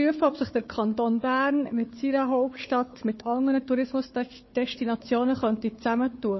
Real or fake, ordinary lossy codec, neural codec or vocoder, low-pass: fake; MP3, 24 kbps; codec, 16 kHz, 4 kbps, FunCodec, trained on Chinese and English, 50 frames a second; 7.2 kHz